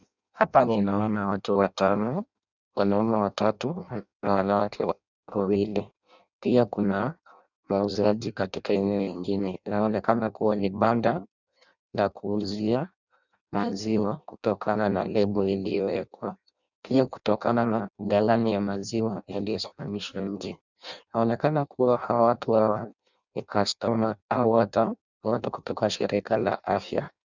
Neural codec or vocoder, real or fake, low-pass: codec, 16 kHz in and 24 kHz out, 0.6 kbps, FireRedTTS-2 codec; fake; 7.2 kHz